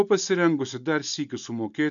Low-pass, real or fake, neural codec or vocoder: 7.2 kHz; real; none